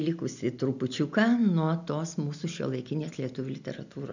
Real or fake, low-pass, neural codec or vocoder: real; 7.2 kHz; none